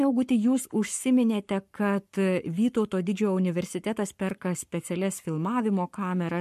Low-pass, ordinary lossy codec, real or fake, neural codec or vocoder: 14.4 kHz; MP3, 64 kbps; fake; codec, 44.1 kHz, 7.8 kbps, Pupu-Codec